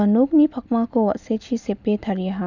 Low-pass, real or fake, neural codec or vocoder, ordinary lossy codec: 7.2 kHz; real; none; none